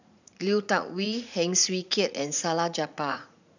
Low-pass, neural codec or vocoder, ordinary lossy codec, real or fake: 7.2 kHz; vocoder, 44.1 kHz, 80 mel bands, Vocos; none; fake